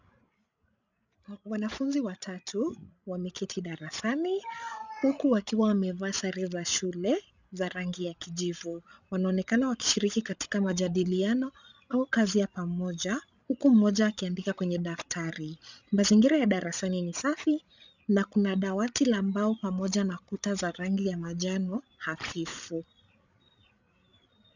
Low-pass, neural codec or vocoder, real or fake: 7.2 kHz; codec, 16 kHz, 16 kbps, FreqCodec, larger model; fake